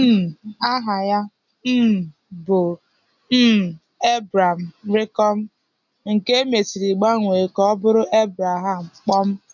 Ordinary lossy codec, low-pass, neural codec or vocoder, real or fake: none; 7.2 kHz; none; real